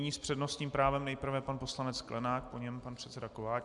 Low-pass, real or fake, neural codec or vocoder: 10.8 kHz; real; none